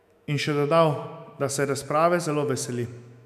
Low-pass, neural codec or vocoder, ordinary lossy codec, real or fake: 14.4 kHz; autoencoder, 48 kHz, 128 numbers a frame, DAC-VAE, trained on Japanese speech; none; fake